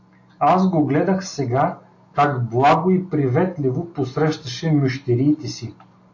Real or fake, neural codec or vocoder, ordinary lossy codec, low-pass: real; none; AAC, 48 kbps; 7.2 kHz